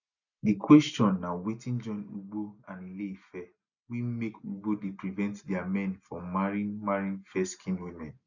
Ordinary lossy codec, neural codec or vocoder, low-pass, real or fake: none; none; 7.2 kHz; real